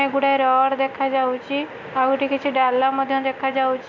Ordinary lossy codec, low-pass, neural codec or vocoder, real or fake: MP3, 64 kbps; 7.2 kHz; none; real